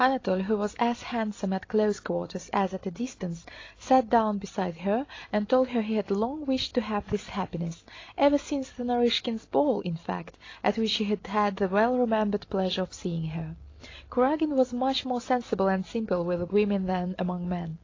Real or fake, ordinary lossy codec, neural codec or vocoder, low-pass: real; AAC, 32 kbps; none; 7.2 kHz